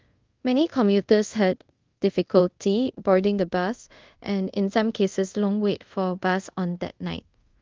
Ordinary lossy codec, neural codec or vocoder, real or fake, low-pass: Opus, 32 kbps; codec, 24 kHz, 0.5 kbps, DualCodec; fake; 7.2 kHz